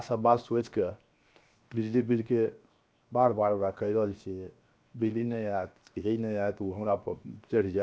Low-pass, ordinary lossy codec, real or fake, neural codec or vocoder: none; none; fake; codec, 16 kHz, 0.7 kbps, FocalCodec